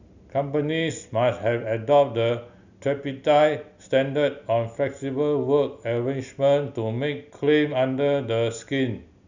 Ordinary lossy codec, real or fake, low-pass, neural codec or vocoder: none; real; 7.2 kHz; none